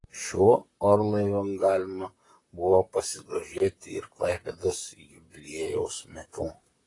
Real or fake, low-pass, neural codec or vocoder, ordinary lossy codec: fake; 10.8 kHz; vocoder, 44.1 kHz, 128 mel bands, Pupu-Vocoder; AAC, 32 kbps